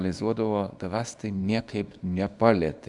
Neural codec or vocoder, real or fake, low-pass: codec, 24 kHz, 0.9 kbps, WavTokenizer, small release; fake; 10.8 kHz